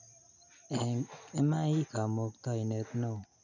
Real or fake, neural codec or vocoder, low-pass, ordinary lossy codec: real; none; 7.2 kHz; none